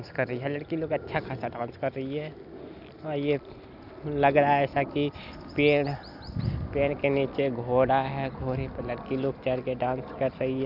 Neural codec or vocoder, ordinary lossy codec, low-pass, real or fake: none; none; 5.4 kHz; real